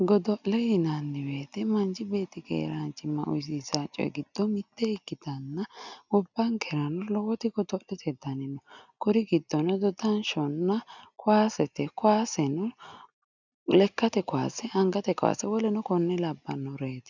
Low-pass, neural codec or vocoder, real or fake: 7.2 kHz; none; real